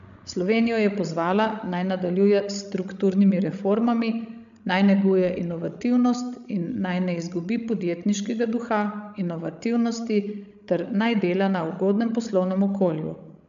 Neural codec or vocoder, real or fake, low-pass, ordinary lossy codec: codec, 16 kHz, 16 kbps, FreqCodec, larger model; fake; 7.2 kHz; AAC, 96 kbps